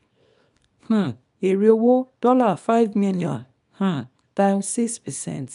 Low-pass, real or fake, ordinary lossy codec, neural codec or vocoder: 10.8 kHz; fake; none; codec, 24 kHz, 0.9 kbps, WavTokenizer, small release